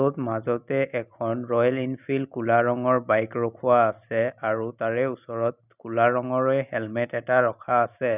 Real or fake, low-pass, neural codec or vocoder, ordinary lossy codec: real; 3.6 kHz; none; none